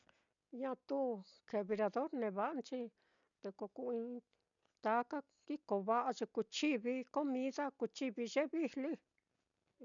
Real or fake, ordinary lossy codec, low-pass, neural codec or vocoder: real; none; 7.2 kHz; none